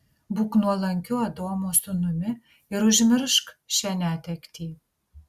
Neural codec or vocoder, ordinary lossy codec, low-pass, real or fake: none; Opus, 64 kbps; 14.4 kHz; real